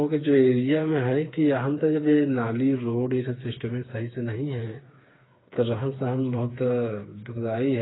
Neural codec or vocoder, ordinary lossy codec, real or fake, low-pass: codec, 16 kHz, 4 kbps, FreqCodec, smaller model; AAC, 16 kbps; fake; 7.2 kHz